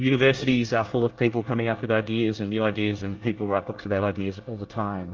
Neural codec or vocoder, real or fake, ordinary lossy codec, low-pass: codec, 24 kHz, 1 kbps, SNAC; fake; Opus, 24 kbps; 7.2 kHz